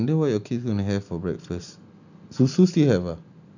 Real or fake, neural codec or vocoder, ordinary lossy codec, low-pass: real; none; none; 7.2 kHz